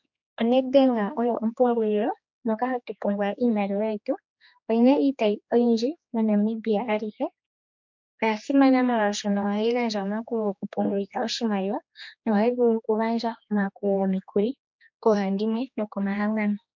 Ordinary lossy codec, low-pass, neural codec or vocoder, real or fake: MP3, 48 kbps; 7.2 kHz; codec, 16 kHz, 2 kbps, X-Codec, HuBERT features, trained on general audio; fake